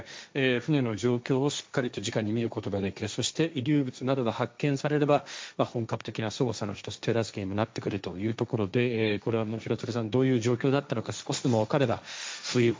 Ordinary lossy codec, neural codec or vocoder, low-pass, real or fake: none; codec, 16 kHz, 1.1 kbps, Voila-Tokenizer; none; fake